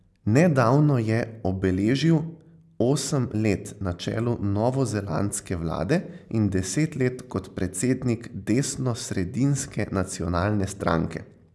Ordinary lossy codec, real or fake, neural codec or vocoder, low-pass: none; real; none; none